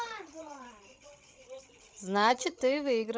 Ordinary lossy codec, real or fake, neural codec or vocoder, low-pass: none; fake; codec, 16 kHz, 16 kbps, FreqCodec, larger model; none